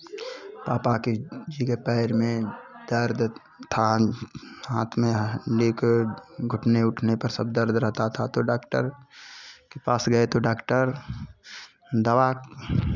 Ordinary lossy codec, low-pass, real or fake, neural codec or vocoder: none; 7.2 kHz; real; none